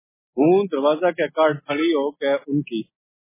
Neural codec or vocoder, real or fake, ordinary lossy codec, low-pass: none; real; MP3, 16 kbps; 3.6 kHz